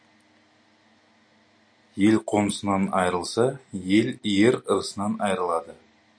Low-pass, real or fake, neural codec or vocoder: 9.9 kHz; real; none